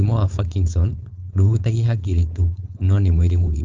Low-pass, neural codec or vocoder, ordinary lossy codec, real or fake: 7.2 kHz; codec, 16 kHz, 4.8 kbps, FACodec; Opus, 32 kbps; fake